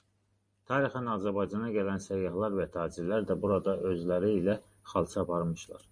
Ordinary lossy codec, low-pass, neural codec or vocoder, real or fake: Opus, 64 kbps; 9.9 kHz; none; real